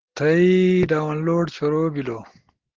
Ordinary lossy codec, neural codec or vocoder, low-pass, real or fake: Opus, 16 kbps; none; 7.2 kHz; real